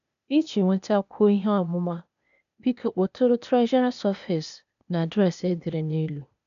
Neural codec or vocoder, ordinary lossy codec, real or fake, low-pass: codec, 16 kHz, 0.8 kbps, ZipCodec; none; fake; 7.2 kHz